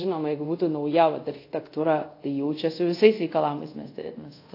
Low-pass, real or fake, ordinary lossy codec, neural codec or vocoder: 5.4 kHz; fake; AAC, 32 kbps; codec, 24 kHz, 0.5 kbps, DualCodec